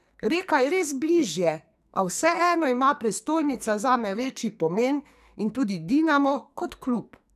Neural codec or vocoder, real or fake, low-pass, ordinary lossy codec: codec, 44.1 kHz, 2.6 kbps, SNAC; fake; 14.4 kHz; none